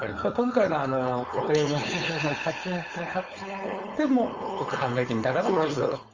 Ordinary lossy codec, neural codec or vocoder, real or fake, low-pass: Opus, 32 kbps; codec, 16 kHz, 4.8 kbps, FACodec; fake; 7.2 kHz